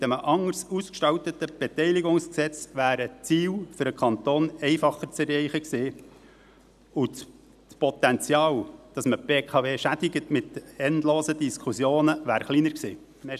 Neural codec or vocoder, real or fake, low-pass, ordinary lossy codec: none; real; 14.4 kHz; none